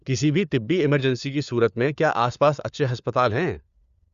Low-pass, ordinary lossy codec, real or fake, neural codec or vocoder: 7.2 kHz; Opus, 64 kbps; fake; codec, 16 kHz, 4 kbps, FunCodec, trained on LibriTTS, 50 frames a second